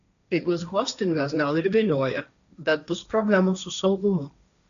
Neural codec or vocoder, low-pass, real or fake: codec, 16 kHz, 1.1 kbps, Voila-Tokenizer; 7.2 kHz; fake